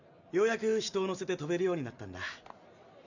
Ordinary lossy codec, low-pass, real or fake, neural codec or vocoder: none; 7.2 kHz; real; none